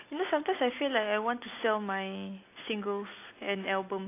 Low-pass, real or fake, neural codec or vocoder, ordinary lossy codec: 3.6 kHz; real; none; AAC, 24 kbps